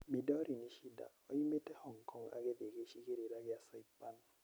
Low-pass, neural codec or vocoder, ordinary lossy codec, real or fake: none; none; none; real